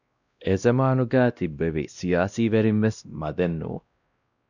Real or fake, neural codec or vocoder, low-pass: fake; codec, 16 kHz, 1 kbps, X-Codec, WavLM features, trained on Multilingual LibriSpeech; 7.2 kHz